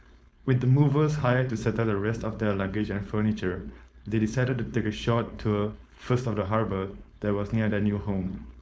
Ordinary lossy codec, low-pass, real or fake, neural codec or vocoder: none; none; fake; codec, 16 kHz, 4.8 kbps, FACodec